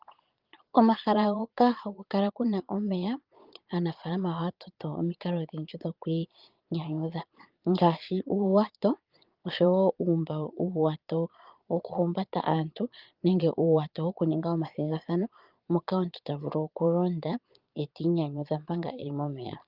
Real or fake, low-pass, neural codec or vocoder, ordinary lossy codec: fake; 5.4 kHz; vocoder, 22.05 kHz, 80 mel bands, Vocos; Opus, 24 kbps